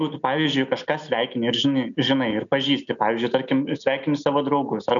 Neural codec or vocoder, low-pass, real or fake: none; 7.2 kHz; real